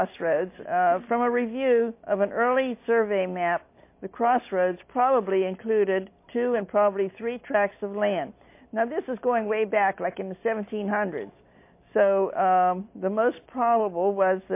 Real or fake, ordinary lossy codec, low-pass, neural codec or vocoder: real; MP3, 24 kbps; 3.6 kHz; none